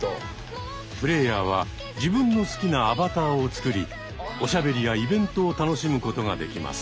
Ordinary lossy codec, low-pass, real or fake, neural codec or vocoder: none; none; real; none